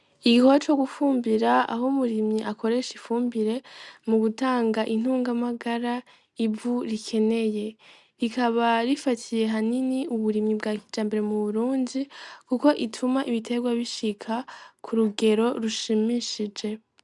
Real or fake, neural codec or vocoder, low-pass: real; none; 10.8 kHz